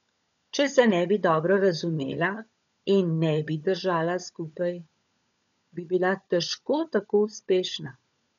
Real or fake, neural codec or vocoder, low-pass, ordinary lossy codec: fake; codec, 16 kHz, 16 kbps, FunCodec, trained on LibriTTS, 50 frames a second; 7.2 kHz; none